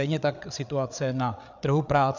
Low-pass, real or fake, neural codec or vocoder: 7.2 kHz; fake; codec, 16 kHz, 8 kbps, FreqCodec, larger model